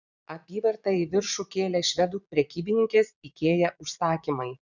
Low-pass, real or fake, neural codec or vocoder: 7.2 kHz; fake; vocoder, 22.05 kHz, 80 mel bands, Vocos